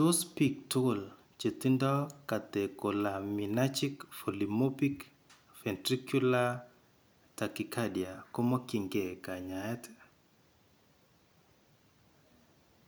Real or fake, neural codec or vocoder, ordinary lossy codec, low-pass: real; none; none; none